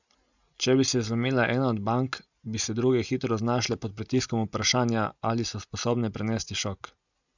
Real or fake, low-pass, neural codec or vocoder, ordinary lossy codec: real; 7.2 kHz; none; none